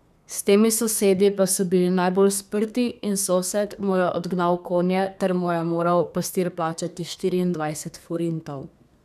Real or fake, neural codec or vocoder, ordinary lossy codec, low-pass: fake; codec, 32 kHz, 1.9 kbps, SNAC; none; 14.4 kHz